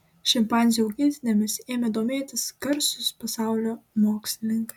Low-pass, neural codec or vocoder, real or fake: 19.8 kHz; none; real